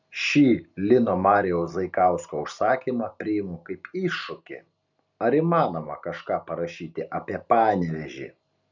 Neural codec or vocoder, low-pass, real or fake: none; 7.2 kHz; real